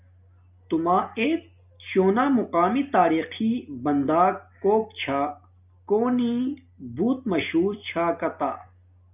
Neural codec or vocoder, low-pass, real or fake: none; 3.6 kHz; real